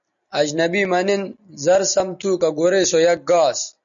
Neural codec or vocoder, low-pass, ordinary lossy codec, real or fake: none; 7.2 kHz; MP3, 96 kbps; real